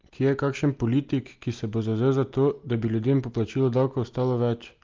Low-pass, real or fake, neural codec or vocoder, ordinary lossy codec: 7.2 kHz; real; none; Opus, 32 kbps